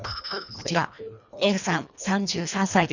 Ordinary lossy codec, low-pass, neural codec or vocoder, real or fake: none; 7.2 kHz; codec, 24 kHz, 1.5 kbps, HILCodec; fake